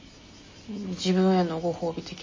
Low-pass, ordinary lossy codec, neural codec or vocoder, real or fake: 7.2 kHz; MP3, 32 kbps; none; real